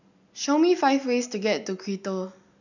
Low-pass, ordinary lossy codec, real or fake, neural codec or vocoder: 7.2 kHz; none; real; none